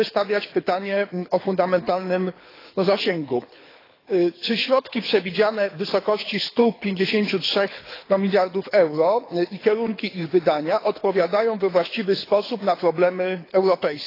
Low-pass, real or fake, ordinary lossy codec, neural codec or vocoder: 5.4 kHz; fake; AAC, 24 kbps; codec, 24 kHz, 6 kbps, HILCodec